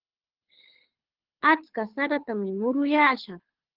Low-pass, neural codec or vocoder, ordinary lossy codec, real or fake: 5.4 kHz; codec, 24 kHz, 6 kbps, HILCodec; Opus, 16 kbps; fake